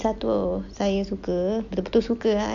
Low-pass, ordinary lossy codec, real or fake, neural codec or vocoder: 7.2 kHz; MP3, 48 kbps; real; none